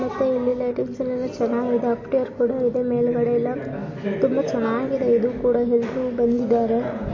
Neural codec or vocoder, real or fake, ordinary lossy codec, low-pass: none; real; MP3, 32 kbps; 7.2 kHz